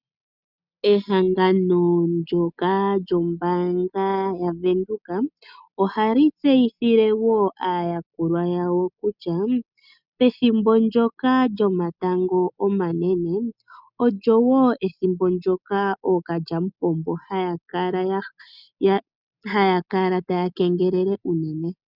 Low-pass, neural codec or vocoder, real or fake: 5.4 kHz; none; real